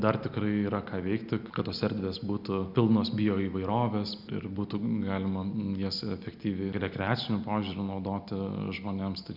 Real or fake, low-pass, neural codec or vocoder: real; 5.4 kHz; none